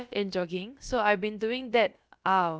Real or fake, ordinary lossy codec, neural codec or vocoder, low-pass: fake; none; codec, 16 kHz, about 1 kbps, DyCAST, with the encoder's durations; none